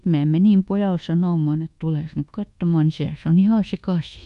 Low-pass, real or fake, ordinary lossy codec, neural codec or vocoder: 10.8 kHz; fake; AAC, 96 kbps; codec, 24 kHz, 1.2 kbps, DualCodec